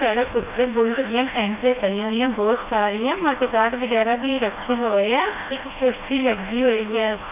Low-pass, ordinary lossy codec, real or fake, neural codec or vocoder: 3.6 kHz; none; fake; codec, 16 kHz, 1 kbps, FreqCodec, smaller model